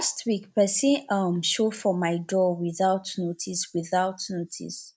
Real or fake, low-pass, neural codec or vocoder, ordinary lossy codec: real; none; none; none